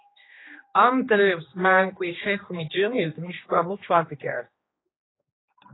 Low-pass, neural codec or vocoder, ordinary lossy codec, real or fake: 7.2 kHz; codec, 16 kHz, 2 kbps, X-Codec, HuBERT features, trained on general audio; AAC, 16 kbps; fake